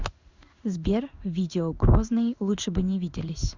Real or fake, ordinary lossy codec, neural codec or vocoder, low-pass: fake; Opus, 64 kbps; codec, 16 kHz in and 24 kHz out, 1 kbps, XY-Tokenizer; 7.2 kHz